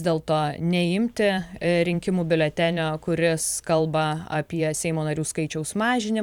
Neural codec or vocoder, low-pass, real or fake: vocoder, 44.1 kHz, 128 mel bands every 512 samples, BigVGAN v2; 19.8 kHz; fake